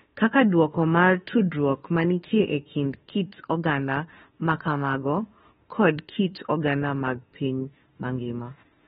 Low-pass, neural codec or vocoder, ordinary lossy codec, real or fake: 19.8 kHz; autoencoder, 48 kHz, 32 numbers a frame, DAC-VAE, trained on Japanese speech; AAC, 16 kbps; fake